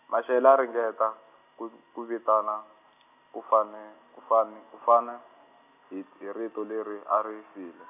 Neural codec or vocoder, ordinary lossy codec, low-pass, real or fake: none; none; 3.6 kHz; real